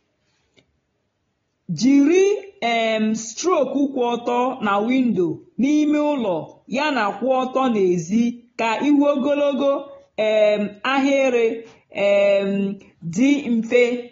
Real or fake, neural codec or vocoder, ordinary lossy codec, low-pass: real; none; AAC, 24 kbps; 7.2 kHz